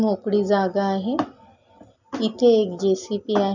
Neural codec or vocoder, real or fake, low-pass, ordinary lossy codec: none; real; 7.2 kHz; none